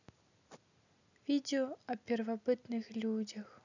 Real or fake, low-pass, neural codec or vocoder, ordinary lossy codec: real; 7.2 kHz; none; none